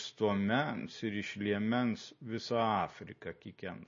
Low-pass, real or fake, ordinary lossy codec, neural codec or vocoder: 7.2 kHz; real; MP3, 32 kbps; none